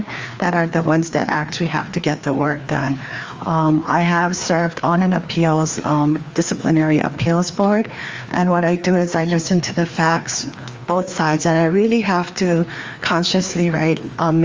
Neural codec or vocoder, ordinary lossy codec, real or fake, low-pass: codec, 16 kHz, 2 kbps, FreqCodec, larger model; Opus, 32 kbps; fake; 7.2 kHz